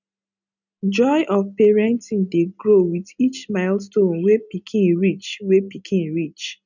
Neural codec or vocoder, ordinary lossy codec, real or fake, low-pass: none; none; real; 7.2 kHz